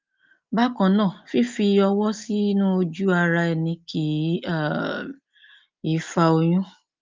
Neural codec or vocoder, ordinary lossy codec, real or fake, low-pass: none; Opus, 24 kbps; real; 7.2 kHz